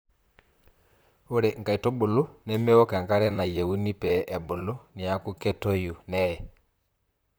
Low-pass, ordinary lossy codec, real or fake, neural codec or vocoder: none; none; fake; vocoder, 44.1 kHz, 128 mel bands, Pupu-Vocoder